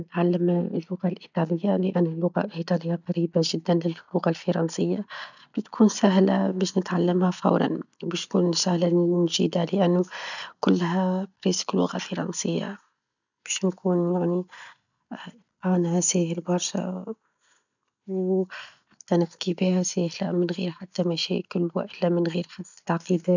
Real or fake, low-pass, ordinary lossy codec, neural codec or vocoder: real; 7.2 kHz; none; none